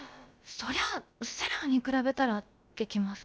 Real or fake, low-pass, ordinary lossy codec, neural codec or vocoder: fake; none; none; codec, 16 kHz, about 1 kbps, DyCAST, with the encoder's durations